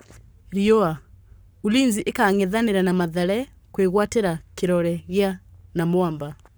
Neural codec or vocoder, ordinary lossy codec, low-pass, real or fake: codec, 44.1 kHz, 7.8 kbps, Pupu-Codec; none; none; fake